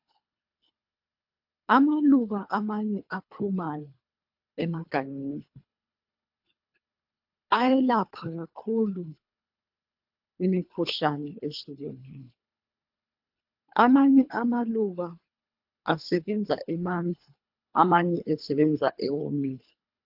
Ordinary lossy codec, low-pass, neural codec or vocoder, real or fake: AAC, 48 kbps; 5.4 kHz; codec, 24 kHz, 3 kbps, HILCodec; fake